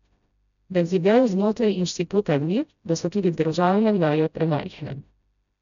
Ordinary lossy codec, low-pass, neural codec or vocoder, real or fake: none; 7.2 kHz; codec, 16 kHz, 0.5 kbps, FreqCodec, smaller model; fake